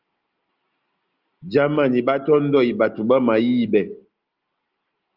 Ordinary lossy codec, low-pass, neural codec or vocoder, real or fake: Opus, 32 kbps; 5.4 kHz; none; real